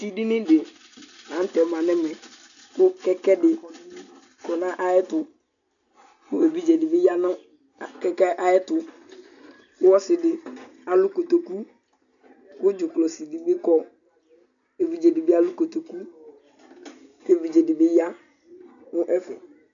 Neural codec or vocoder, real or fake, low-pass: none; real; 7.2 kHz